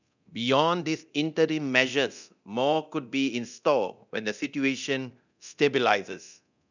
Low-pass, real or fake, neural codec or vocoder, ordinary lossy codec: 7.2 kHz; fake; codec, 24 kHz, 0.9 kbps, DualCodec; none